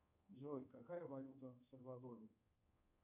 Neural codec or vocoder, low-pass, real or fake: codec, 24 kHz, 1.2 kbps, DualCodec; 3.6 kHz; fake